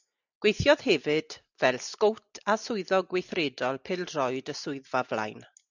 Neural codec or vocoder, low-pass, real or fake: none; 7.2 kHz; real